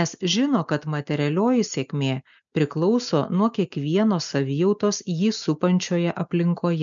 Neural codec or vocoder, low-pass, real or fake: none; 7.2 kHz; real